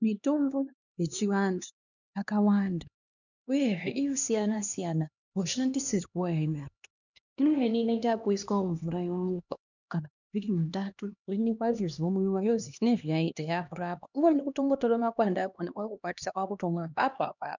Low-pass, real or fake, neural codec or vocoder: 7.2 kHz; fake; codec, 16 kHz, 2 kbps, X-Codec, HuBERT features, trained on LibriSpeech